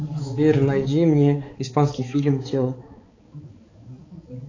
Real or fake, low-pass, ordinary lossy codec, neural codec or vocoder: fake; 7.2 kHz; AAC, 32 kbps; codec, 16 kHz, 4 kbps, X-Codec, HuBERT features, trained on balanced general audio